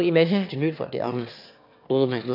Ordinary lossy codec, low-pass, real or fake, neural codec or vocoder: none; 5.4 kHz; fake; autoencoder, 22.05 kHz, a latent of 192 numbers a frame, VITS, trained on one speaker